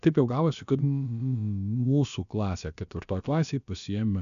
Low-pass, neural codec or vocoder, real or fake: 7.2 kHz; codec, 16 kHz, about 1 kbps, DyCAST, with the encoder's durations; fake